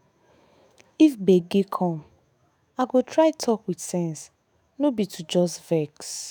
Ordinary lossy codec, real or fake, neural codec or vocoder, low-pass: none; fake; autoencoder, 48 kHz, 128 numbers a frame, DAC-VAE, trained on Japanese speech; none